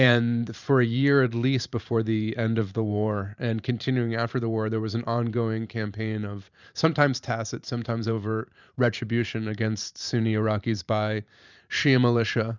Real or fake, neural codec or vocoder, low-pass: fake; vocoder, 44.1 kHz, 128 mel bands every 512 samples, BigVGAN v2; 7.2 kHz